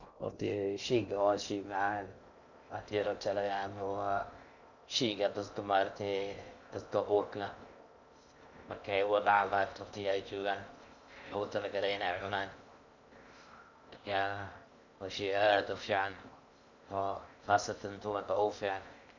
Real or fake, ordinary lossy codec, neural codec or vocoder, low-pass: fake; none; codec, 16 kHz in and 24 kHz out, 0.6 kbps, FocalCodec, streaming, 2048 codes; 7.2 kHz